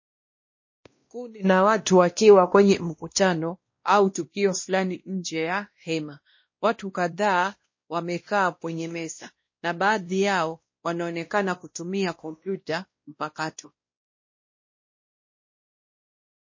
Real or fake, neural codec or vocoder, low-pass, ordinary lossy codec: fake; codec, 16 kHz, 1 kbps, X-Codec, WavLM features, trained on Multilingual LibriSpeech; 7.2 kHz; MP3, 32 kbps